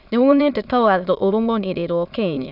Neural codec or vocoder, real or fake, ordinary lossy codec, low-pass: autoencoder, 22.05 kHz, a latent of 192 numbers a frame, VITS, trained on many speakers; fake; none; 5.4 kHz